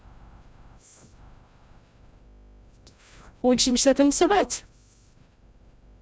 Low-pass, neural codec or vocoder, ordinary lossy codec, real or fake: none; codec, 16 kHz, 0.5 kbps, FreqCodec, larger model; none; fake